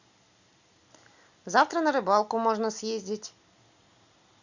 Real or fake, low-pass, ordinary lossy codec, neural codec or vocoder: real; 7.2 kHz; Opus, 64 kbps; none